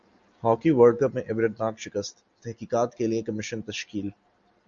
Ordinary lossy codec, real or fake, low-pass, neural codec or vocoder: Opus, 64 kbps; real; 7.2 kHz; none